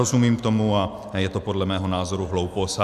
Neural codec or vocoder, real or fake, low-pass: none; real; 14.4 kHz